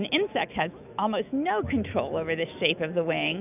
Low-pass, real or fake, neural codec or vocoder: 3.6 kHz; fake; autoencoder, 48 kHz, 128 numbers a frame, DAC-VAE, trained on Japanese speech